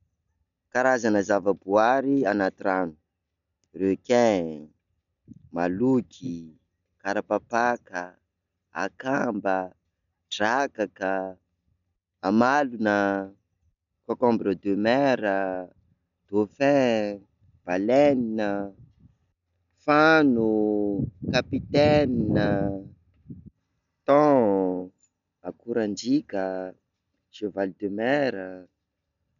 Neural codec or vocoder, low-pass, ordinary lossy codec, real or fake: none; 7.2 kHz; MP3, 96 kbps; real